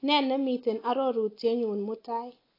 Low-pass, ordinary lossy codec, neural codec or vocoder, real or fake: 5.4 kHz; AAC, 24 kbps; none; real